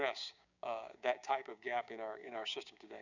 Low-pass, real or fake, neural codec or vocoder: 7.2 kHz; fake; codec, 16 kHz, 6 kbps, DAC